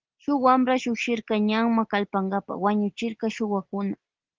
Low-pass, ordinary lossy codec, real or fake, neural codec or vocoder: 7.2 kHz; Opus, 16 kbps; real; none